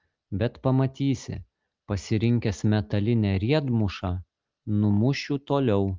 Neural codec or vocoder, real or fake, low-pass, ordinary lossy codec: none; real; 7.2 kHz; Opus, 24 kbps